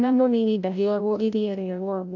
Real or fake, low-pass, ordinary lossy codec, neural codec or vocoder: fake; 7.2 kHz; none; codec, 16 kHz, 0.5 kbps, FreqCodec, larger model